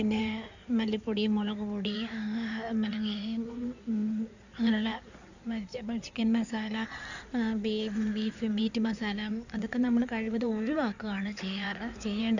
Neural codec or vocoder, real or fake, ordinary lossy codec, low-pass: codec, 16 kHz in and 24 kHz out, 2.2 kbps, FireRedTTS-2 codec; fake; none; 7.2 kHz